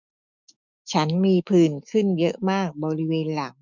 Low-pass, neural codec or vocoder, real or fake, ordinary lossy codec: 7.2 kHz; autoencoder, 48 kHz, 128 numbers a frame, DAC-VAE, trained on Japanese speech; fake; none